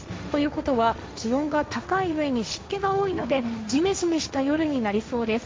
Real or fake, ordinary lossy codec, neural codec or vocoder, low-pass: fake; none; codec, 16 kHz, 1.1 kbps, Voila-Tokenizer; none